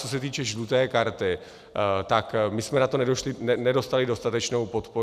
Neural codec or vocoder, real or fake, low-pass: none; real; 14.4 kHz